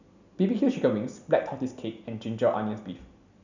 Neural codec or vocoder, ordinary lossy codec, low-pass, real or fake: none; none; 7.2 kHz; real